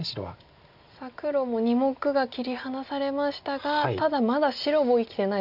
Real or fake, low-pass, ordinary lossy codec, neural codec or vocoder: real; 5.4 kHz; AAC, 48 kbps; none